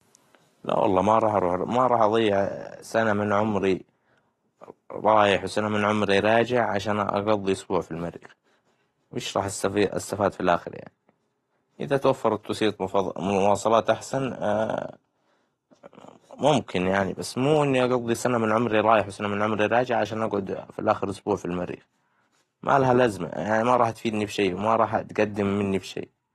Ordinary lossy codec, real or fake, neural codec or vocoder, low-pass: AAC, 32 kbps; real; none; 19.8 kHz